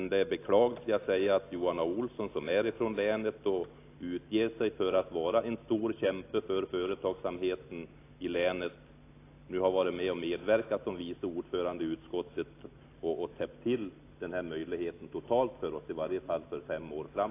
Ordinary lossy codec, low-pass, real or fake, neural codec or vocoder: AAC, 24 kbps; 3.6 kHz; real; none